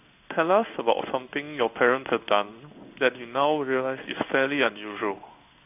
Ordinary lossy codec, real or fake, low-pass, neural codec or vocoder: none; fake; 3.6 kHz; codec, 16 kHz in and 24 kHz out, 1 kbps, XY-Tokenizer